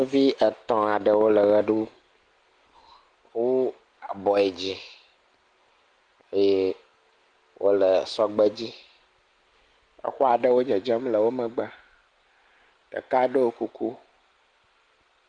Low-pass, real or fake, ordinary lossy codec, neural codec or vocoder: 9.9 kHz; real; Opus, 24 kbps; none